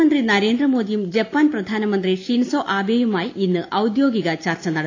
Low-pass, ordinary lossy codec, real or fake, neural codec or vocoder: 7.2 kHz; AAC, 32 kbps; real; none